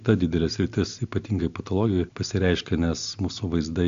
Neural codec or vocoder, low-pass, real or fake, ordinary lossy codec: none; 7.2 kHz; real; AAC, 64 kbps